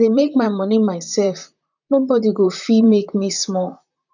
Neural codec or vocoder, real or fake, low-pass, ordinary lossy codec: vocoder, 44.1 kHz, 128 mel bands, Pupu-Vocoder; fake; 7.2 kHz; none